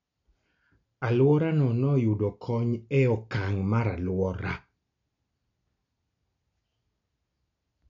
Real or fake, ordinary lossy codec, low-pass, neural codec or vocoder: real; none; 7.2 kHz; none